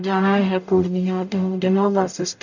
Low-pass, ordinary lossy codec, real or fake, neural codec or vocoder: 7.2 kHz; none; fake; codec, 44.1 kHz, 0.9 kbps, DAC